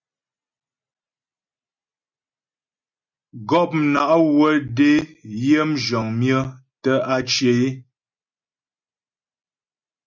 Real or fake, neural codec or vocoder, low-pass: real; none; 7.2 kHz